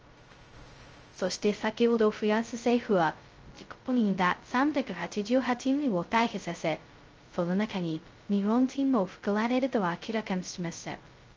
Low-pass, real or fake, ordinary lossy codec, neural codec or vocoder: 7.2 kHz; fake; Opus, 24 kbps; codec, 16 kHz, 0.2 kbps, FocalCodec